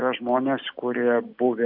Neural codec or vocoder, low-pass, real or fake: none; 5.4 kHz; real